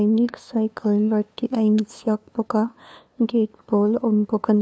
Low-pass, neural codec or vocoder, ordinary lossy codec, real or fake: none; codec, 16 kHz, 2 kbps, FunCodec, trained on LibriTTS, 25 frames a second; none; fake